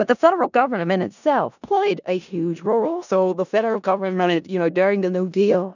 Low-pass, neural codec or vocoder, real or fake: 7.2 kHz; codec, 16 kHz in and 24 kHz out, 0.4 kbps, LongCat-Audio-Codec, four codebook decoder; fake